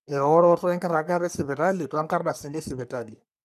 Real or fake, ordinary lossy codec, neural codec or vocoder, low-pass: fake; none; codec, 44.1 kHz, 3.4 kbps, Pupu-Codec; 14.4 kHz